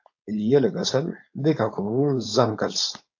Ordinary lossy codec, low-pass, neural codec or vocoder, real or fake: AAC, 48 kbps; 7.2 kHz; codec, 16 kHz, 4.8 kbps, FACodec; fake